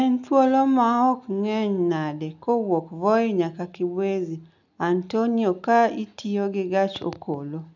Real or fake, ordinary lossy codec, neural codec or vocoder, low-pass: real; none; none; 7.2 kHz